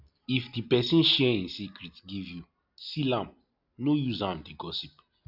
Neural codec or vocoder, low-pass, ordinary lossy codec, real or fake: none; 5.4 kHz; none; real